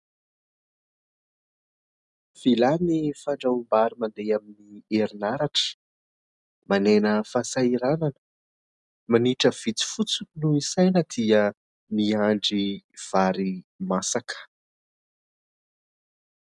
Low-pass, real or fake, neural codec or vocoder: 10.8 kHz; real; none